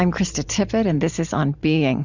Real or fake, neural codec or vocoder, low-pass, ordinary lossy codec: fake; vocoder, 44.1 kHz, 128 mel bands every 512 samples, BigVGAN v2; 7.2 kHz; Opus, 64 kbps